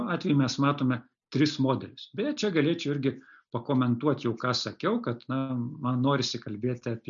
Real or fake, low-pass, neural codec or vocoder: real; 7.2 kHz; none